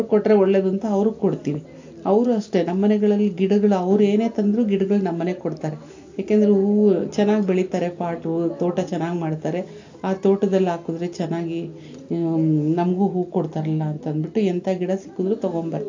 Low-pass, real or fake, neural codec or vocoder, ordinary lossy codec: 7.2 kHz; real; none; AAC, 48 kbps